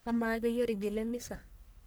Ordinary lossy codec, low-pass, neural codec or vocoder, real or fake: none; none; codec, 44.1 kHz, 1.7 kbps, Pupu-Codec; fake